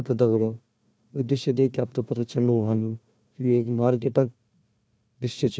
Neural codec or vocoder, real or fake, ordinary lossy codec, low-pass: codec, 16 kHz, 1 kbps, FunCodec, trained on Chinese and English, 50 frames a second; fake; none; none